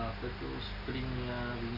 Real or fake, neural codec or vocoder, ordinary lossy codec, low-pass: real; none; none; 5.4 kHz